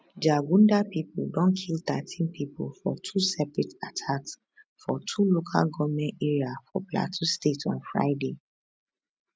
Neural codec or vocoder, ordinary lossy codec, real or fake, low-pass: none; none; real; none